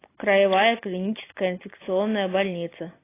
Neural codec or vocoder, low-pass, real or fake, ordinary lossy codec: none; 3.6 kHz; real; AAC, 24 kbps